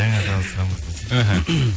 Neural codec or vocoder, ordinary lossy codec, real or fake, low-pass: none; none; real; none